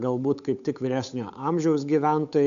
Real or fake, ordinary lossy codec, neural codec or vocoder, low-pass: fake; AAC, 96 kbps; codec, 16 kHz, 8 kbps, FunCodec, trained on Chinese and English, 25 frames a second; 7.2 kHz